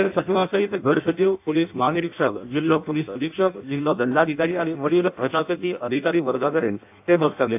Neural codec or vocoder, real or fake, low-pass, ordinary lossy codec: codec, 16 kHz in and 24 kHz out, 0.6 kbps, FireRedTTS-2 codec; fake; 3.6 kHz; AAC, 32 kbps